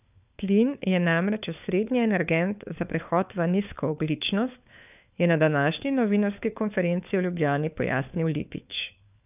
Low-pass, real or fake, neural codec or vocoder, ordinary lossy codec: 3.6 kHz; fake; codec, 16 kHz, 4 kbps, FunCodec, trained on LibriTTS, 50 frames a second; none